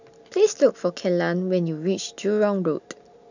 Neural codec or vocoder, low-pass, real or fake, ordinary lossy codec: none; 7.2 kHz; real; none